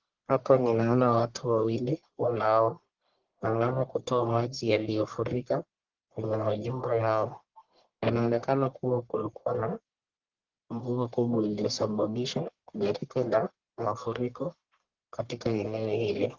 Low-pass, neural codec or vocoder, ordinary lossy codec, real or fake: 7.2 kHz; codec, 44.1 kHz, 1.7 kbps, Pupu-Codec; Opus, 16 kbps; fake